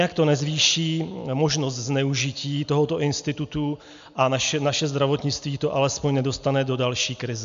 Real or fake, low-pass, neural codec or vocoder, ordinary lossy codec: real; 7.2 kHz; none; MP3, 64 kbps